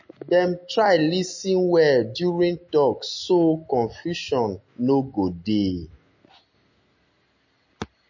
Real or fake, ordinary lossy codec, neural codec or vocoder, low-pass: real; MP3, 32 kbps; none; 7.2 kHz